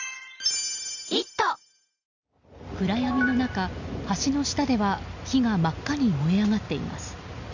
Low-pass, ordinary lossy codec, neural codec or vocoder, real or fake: 7.2 kHz; none; none; real